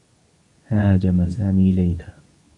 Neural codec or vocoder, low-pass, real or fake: codec, 24 kHz, 0.9 kbps, WavTokenizer, medium speech release version 2; 10.8 kHz; fake